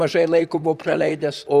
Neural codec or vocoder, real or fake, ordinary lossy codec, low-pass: vocoder, 44.1 kHz, 128 mel bands, Pupu-Vocoder; fake; Opus, 64 kbps; 14.4 kHz